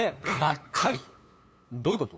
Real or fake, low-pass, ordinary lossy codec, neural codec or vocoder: fake; none; none; codec, 16 kHz, 2 kbps, FunCodec, trained on LibriTTS, 25 frames a second